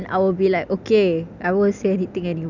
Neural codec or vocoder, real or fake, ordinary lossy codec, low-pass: none; real; none; 7.2 kHz